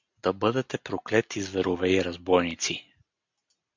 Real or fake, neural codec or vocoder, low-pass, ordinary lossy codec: real; none; 7.2 kHz; MP3, 64 kbps